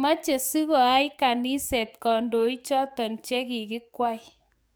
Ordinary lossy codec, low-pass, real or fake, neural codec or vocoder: none; none; fake; codec, 44.1 kHz, 7.8 kbps, DAC